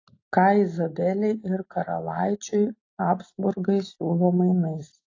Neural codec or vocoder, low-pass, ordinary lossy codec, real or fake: none; 7.2 kHz; AAC, 32 kbps; real